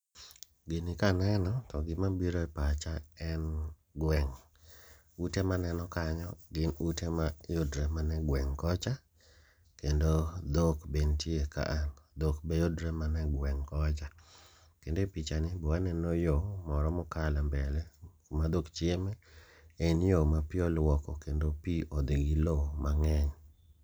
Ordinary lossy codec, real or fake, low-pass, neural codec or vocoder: none; real; none; none